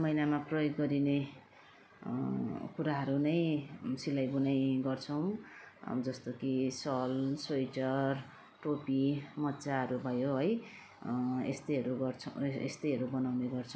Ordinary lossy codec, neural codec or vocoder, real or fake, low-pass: none; none; real; none